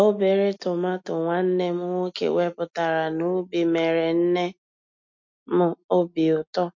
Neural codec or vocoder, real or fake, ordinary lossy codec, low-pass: none; real; MP3, 48 kbps; 7.2 kHz